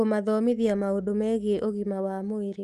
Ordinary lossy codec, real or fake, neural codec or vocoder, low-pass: Opus, 32 kbps; fake; autoencoder, 48 kHz, 128 numbers a frame, DAC-VAE, trained on Japanese speech; 14.4 kHz